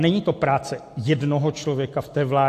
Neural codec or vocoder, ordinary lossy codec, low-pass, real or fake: none; AAC, 64 kbps; 14.4 kHz; real